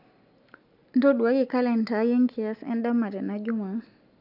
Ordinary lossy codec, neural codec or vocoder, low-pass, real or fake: none; none; 5.4 kHz; real